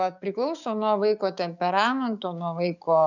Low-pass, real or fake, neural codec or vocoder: 7.2 kHz; fake; codec, 16 kHz, 6 kbps, DAC